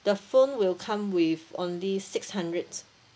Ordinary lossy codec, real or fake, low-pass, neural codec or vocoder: none; real; none; none